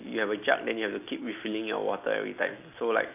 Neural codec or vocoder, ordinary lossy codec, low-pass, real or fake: none; none; 3.6 kHz; real